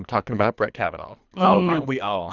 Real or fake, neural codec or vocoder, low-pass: fake; codec, 24 kHz, 3 kbps, HILCodec; 7.2 kHz